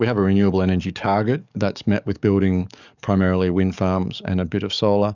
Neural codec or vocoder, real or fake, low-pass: codec, 44.1 kHz, 7.8 kbps, DAC; fake; 7.2 kHz